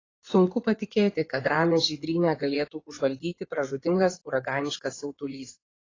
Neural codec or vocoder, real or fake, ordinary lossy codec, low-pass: codec, 16 kHz in and 24 kHz out, 2.2 kbps, FireRedTTS-2 codec; fake; AAC, 32 kbps; 7.2 kHz